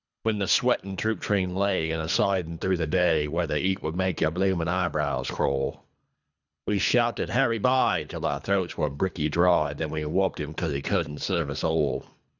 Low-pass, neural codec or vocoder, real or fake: 7.2 kHz; codec, 24 kHz, 3 kbps, HILCodec; fake